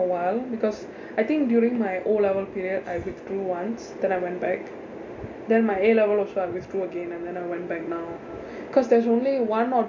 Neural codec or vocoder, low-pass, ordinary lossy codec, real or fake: none; 7.2 kHz; MP3, 48 kbps; real